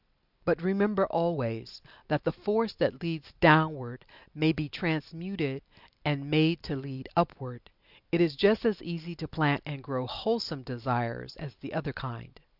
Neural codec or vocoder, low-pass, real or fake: none; 5.4 kHz; real